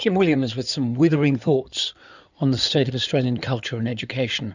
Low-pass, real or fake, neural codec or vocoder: 7.2 kHz; fake; codec, 16 kHz in and 24 kHz out, 2.2 kbps, FireRedTTS-2 codec